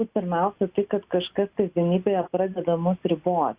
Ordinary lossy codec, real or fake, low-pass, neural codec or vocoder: Opus, 64 kbps; real; 3.6 kHz; none